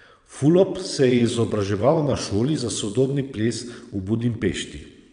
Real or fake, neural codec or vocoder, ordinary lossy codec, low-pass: fake; vocoder, 22.05 kHz, 80 mel bands, WaveNeXt; none; 9.9 kHz